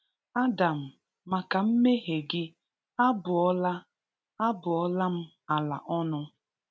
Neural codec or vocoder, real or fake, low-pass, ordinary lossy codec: none; real; none; none